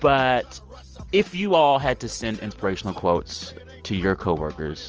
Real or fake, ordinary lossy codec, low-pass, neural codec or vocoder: real; Opus, 24 kbps; 7.2 kHz; none